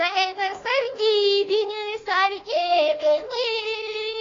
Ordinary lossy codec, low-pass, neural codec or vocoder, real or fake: AAC, 32 kbps; 7.2 kHz; codec, 16 kHz, 1 kbps, FunCodec, trained on Chinese and English, 50 frames a second; fake